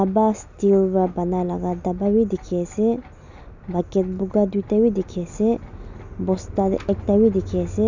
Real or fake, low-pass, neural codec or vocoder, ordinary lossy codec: real; 7.2 kHz; none; none